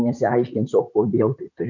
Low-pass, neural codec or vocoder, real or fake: 7.2 kHz; vocoder, 44.1 kHz, 128 mel bands, Pupu-Vocoder; fake